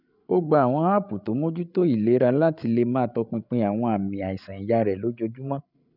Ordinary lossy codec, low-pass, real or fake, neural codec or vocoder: none; 5.4 kHz; fake; codec, 16 kHz, 8 kbps, FreqCodec, larger model